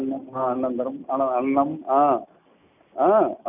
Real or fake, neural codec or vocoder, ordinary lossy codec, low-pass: real; none; none; 3.6 kHz